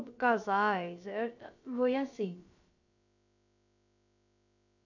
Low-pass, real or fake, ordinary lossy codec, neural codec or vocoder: 7.2 kHz; fake; none; codec, 16 kHz, about 1 kbps, DyCAST, with the encoder's durations